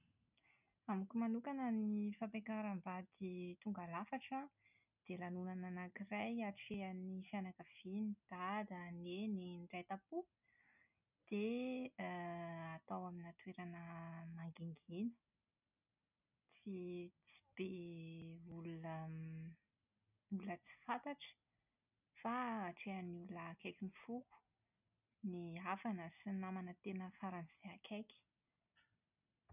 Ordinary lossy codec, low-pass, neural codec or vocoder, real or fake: none; 3.6 kHz; none; real